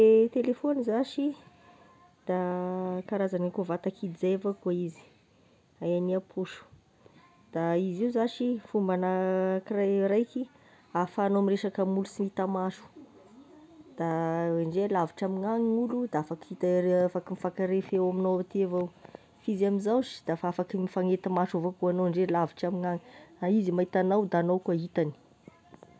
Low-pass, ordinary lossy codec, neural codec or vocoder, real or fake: none; none; none; real